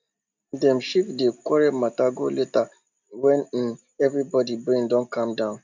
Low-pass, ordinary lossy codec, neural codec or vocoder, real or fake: 7.2 kHz; none; none; real